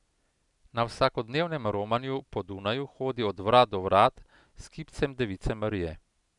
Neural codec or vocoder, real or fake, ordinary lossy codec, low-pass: none; real; none; 10.8 kHz